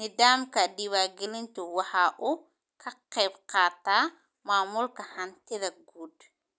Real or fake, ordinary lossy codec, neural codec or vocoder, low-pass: real; none; none; none